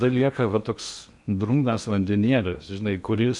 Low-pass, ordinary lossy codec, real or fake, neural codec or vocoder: 10.8 kHz; MP3, 96 kbps; fake; codec, 16 kHz in and 24 kHz out, 0.8 kbps, FocalCodec, streaming, 65536 codes